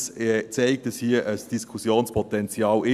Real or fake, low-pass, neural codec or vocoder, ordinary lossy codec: real; 14.4 kHz; none; none